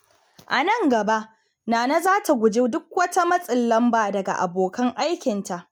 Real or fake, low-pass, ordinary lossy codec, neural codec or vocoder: real; none; none; none